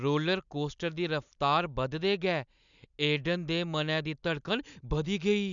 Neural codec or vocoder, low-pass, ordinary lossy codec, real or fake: none; 7.2 kHz; none; real